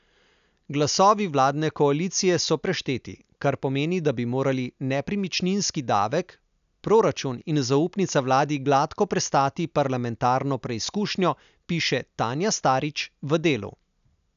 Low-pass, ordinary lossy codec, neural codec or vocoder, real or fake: 7.2 kHz; none; none; real